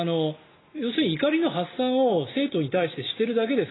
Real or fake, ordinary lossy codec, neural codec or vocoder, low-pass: real; AAC, 16 kbps; none; 7.2 kHz